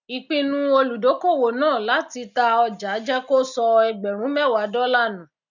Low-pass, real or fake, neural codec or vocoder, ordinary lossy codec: 7.2 kHz; real; none; none